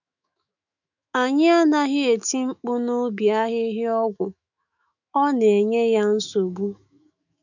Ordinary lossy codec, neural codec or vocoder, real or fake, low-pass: none; autoencoder, 48 kHz, 128 numbers a frame, DAC-VAE, trained on Japanese speech; fake; 7.2 kHz